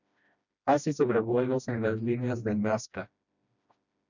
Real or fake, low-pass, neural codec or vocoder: fake; 7.2 kHz; codec, 16 kHz, 1 kbps, FreqCodec, smaller model